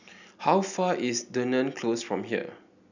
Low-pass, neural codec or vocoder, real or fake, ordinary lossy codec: 7.2 kHz; none; real; none